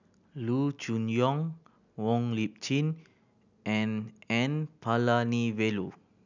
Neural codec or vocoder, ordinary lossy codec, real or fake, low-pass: none; none; real; 7.2 kHz